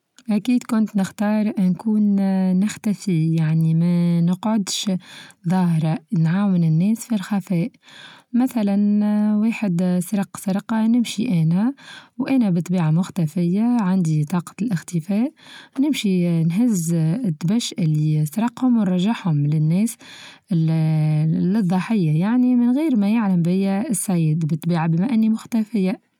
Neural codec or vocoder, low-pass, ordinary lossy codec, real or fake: none; 19.8 kHz; none; real